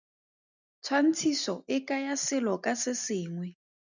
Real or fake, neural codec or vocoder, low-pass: real; none; 7.2 kHz